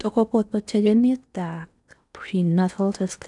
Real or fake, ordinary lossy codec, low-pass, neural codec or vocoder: fake; none; 10.8 kHz; codec, 16 kHz in and 24 kHz out, 0.8 kbps, FocalCodec, streaming, 65536 codes